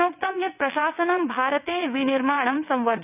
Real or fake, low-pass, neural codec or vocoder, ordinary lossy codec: fake; 3.6 kHz; vocoder, 22.05 kHz, 80 mel bands, WaveNeXt; none